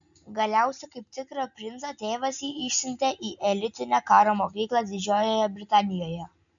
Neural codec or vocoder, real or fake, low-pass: none; real; 7.2 kHz